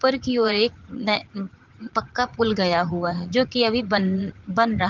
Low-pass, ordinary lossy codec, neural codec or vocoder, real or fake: 7.2 kHz; Opus, 16 kbps; vocoder, 44.1 kHz, 80 mel bands, Vocos; fake